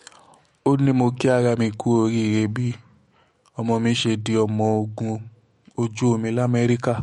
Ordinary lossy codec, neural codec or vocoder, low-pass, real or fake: MP3, 48 kbps; autoencoder, 48 kHz, 128 numbers a frame, DAC-VAE, trained on Japanese speech; 19.8 kHz; fake